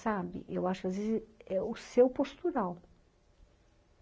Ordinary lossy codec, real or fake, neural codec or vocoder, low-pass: none; real; none; none